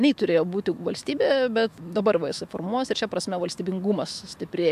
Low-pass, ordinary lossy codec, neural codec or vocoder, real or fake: 14.4 kHz; AAC, 96 kbps; autoencoder, 48 kHz, 128 numbers a frame, DAC-VAE, trained on Japanese speech; fake